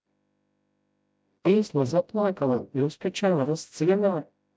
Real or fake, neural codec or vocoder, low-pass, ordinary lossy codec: fake; codec, 16 kHz, 0.5 kbps, FreqCodec, smaller model; none; none